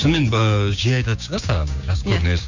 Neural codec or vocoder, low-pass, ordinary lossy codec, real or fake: codec, 16 kHz, 6 kbps, DAC; 7.2 kHz; none; fake